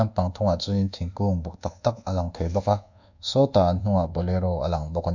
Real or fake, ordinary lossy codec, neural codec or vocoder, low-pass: fake; none; codec, 24 kHz, 1.2 kbps, DualCodec; 7.2 kHz